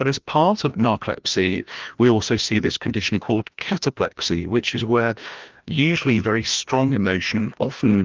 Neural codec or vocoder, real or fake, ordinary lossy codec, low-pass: codec, 16 kHz, 1 kbps, FreqCodec, larger model; fake; Opus, 24 kbps; 7.2 kHz